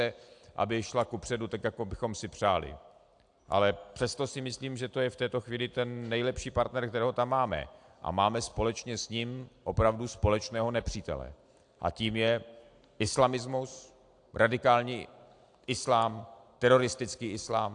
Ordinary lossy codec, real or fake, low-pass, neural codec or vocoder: AAC, 64 kbps; real; 9.9 kHz; none